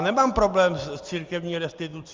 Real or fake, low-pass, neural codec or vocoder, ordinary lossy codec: real; 7.2 kHz; none; Opus, 32 kbps